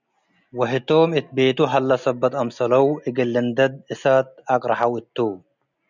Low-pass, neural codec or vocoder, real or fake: 7.2 kHz; none; real